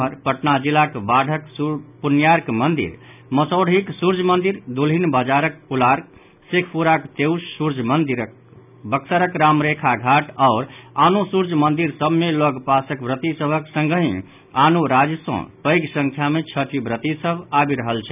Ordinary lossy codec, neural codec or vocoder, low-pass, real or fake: none; none; 3.6 kHz; real